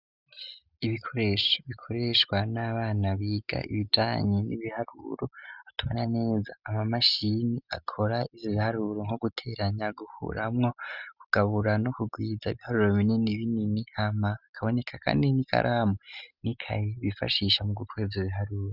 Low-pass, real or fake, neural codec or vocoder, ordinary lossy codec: 5.4 kHz; real; none; Opus, 64 kbps